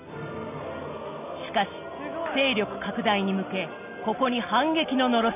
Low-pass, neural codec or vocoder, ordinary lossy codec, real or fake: 3.6 kHz; none; none; real